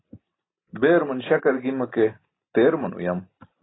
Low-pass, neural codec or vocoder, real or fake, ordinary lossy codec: 7.2 kHz; none; real; AAC, 16 kbps